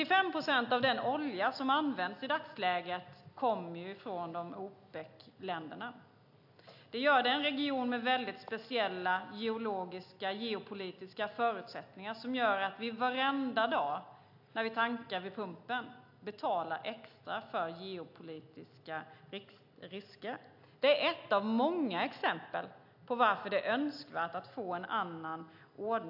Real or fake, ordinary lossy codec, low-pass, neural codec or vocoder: real; AAC, 48 kbps; 5.4 kHz; none